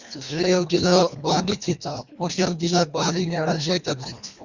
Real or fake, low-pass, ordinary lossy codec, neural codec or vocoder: fake; 7.2 kHz; Opus, 64 kbps; codec, 24 kHz, 1.5 kbps, HILCodec